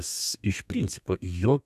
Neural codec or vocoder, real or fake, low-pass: codec, 32 kHz, 1.9 kbps, SNAC; fake; 14.4 kHz